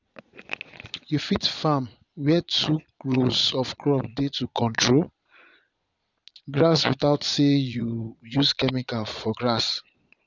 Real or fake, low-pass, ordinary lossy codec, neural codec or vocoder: fake; 7.2 kHz; none; vocoder, 22.05 kHz, 80 mel bands, Vocos